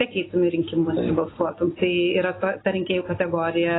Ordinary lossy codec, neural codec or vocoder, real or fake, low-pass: AAC, 16 kbps; none; real; 7.2 kHz